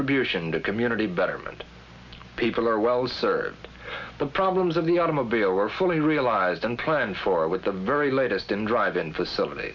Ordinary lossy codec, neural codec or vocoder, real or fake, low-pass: MP3, 64 kbps; none; real; 7.2 kHz